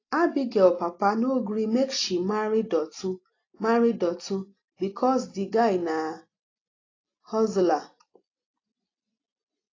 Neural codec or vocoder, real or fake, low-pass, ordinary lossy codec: none; real; 7.2 kHz; AAC, 32 kbps